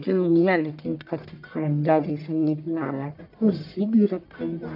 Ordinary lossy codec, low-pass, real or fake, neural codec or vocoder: none; 5.4 kHz; fake; codec, 44.1 kHz, 1.7 kbps, Pupu-Codec